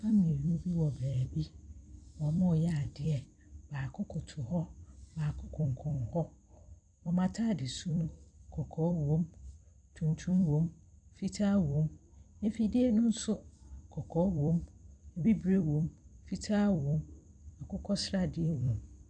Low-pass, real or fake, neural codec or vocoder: 9.9 kHz; fake; vocoder, 44.1 kHz, 128 mel bands every 256 samples, BigVGAN v2